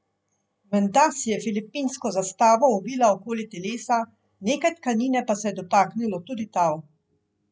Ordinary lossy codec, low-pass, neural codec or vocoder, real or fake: none; none; none; real